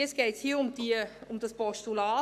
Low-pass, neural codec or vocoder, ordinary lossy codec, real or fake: 14.4 kHz; codec, 44.1 kHz, 7.8 kbps, Pupu-Codec; none; fake